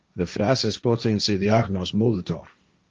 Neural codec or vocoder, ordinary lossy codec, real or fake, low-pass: codec, 16 kHz, 1.1 kbps, Voila-Tokenizer; Opus, 32 kbps; fake; 7.2 kHz